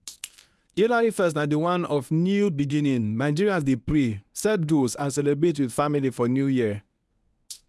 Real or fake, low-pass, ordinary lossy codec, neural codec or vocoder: fake; none; none; codec, 24 kHz, 0.9 kbps, WavTokenizer, medium speech release version 1